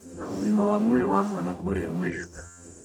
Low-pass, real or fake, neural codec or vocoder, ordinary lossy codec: 19.8 kHz; fake; codec, 44.1 kHz, 0.9 kbps, DAC; none